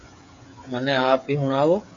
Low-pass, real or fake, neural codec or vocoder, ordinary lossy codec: 7.2 kHz; fake; codec, 16 kHz, 8 kbps, FreqCodec, smaller model; AAC, 64 kbps